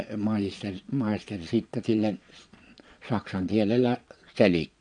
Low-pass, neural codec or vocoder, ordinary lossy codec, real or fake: 9.9 kHz; vocoder, 22.05 kHz, 80 mel bands, Vocos; none; fake